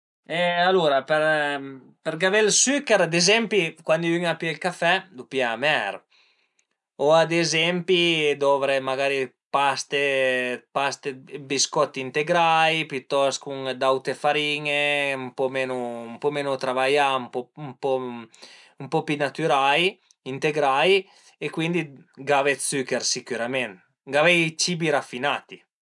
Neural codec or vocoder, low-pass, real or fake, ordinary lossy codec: none; 10.8 kHz; real; none